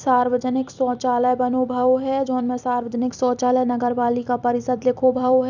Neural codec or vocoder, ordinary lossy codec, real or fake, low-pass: vocoder, 44.1 kHz, 128 mel bands every 256 samples, BigVGAN v2; none; fake; 7.2 kHz